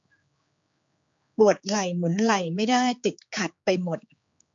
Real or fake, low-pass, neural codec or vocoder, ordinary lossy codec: fake; 7.2 kHz; codec, 16 kHz, 4 kbps, X-Codec, HuBERT features, trained on general audio; MP3, 48 kbps